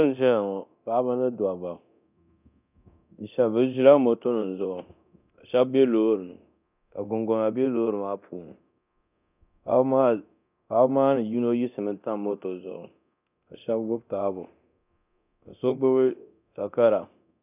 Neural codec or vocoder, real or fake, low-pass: codec, 24 kHz, 0.9 kbps, DualCodec; fake; 3.6 kHz